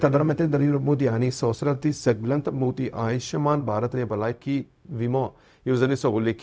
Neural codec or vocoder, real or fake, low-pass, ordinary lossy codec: codec, 16 kHz, 0.4 kbps, LongCat-Audio-Codec; fake; none; none